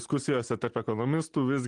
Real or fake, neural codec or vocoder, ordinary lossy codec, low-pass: real; none; Opus, 24 kbps; 9.9 kHz